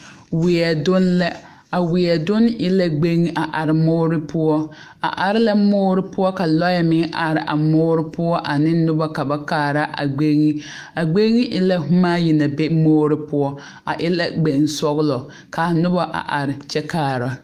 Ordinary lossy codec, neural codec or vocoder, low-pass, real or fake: Opus, 32 kbps; autoencoder, 48 kHz, 128 numbers a frame, DAC-VAE, trained on Japanese speech; 14.4 kHz; fake